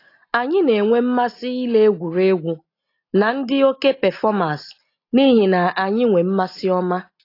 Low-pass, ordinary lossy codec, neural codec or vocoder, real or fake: 5.4 kHz; AAC, 32 kbps; none; real